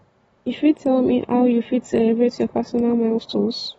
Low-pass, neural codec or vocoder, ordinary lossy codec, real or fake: 19.8 kHz; none; AAC, 24 kbps; real